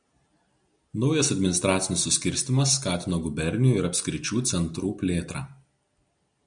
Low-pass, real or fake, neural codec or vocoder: 9.9 kHz; real; none